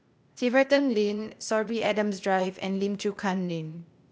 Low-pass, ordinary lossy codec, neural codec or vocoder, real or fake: none; none; codec, 16 kHz, 0.8 kbps, ZipCodec; fake